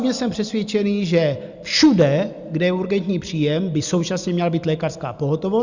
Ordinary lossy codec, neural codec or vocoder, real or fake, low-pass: Opus, 64 kbps; none; real; 7.2 kHz